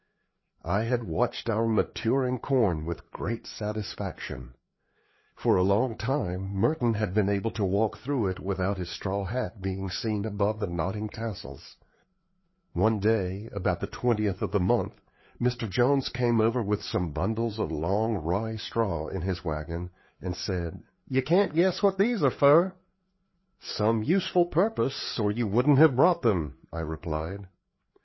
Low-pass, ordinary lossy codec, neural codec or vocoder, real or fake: 7.2 kHz; MP3, 24 kbps; codec, 16 kHz, 4 kbps, FreqCodec, larger model; fake